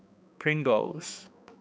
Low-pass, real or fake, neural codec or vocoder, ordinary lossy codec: none; fake; codec, 16 kHz, 2 kbps, X-Codec, HuBERT features, trained on balanced general audio; none